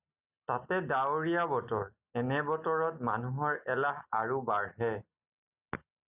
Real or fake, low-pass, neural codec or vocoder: real; 3.6 kHz; none